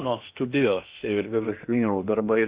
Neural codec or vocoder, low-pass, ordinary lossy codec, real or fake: codec, 16 kHz in and 24 kHz out, 0.8 kbps, FocalCodec, streaming, 65536 codes; 3.6 kHz; Opus, 64 kbps; fake